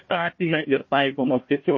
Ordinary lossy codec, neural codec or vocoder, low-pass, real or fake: MP3, 32 kbps; codec, 16 kHz, 1 kbps, FunCodec, trained on Chinese and English, 50 frames a second; 7.2 kHz; fake